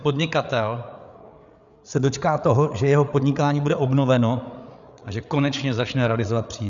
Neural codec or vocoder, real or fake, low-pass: codec, 16 kHz, 8 kbps, FreqCodec, larger model; fake; 7.2 kHz